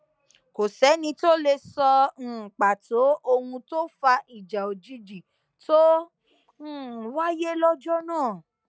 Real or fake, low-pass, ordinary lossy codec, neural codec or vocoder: real; none; none; none